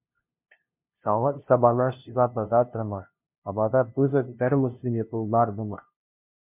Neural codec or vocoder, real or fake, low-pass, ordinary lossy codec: codec, 16 kHz, 0.5 kbps, FunCodec, trained on LibriTTS, 25 frames a second; fake; 3.6 kHz; MP3, 32 kbps